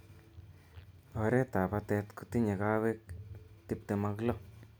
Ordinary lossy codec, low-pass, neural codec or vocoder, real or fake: none; none; none; real